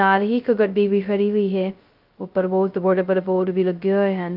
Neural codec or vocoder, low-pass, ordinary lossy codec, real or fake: codec, 16 kHz, 0.2 kbps, FocalCodec; 5.4 kHz; Opus, 24 kbps; fake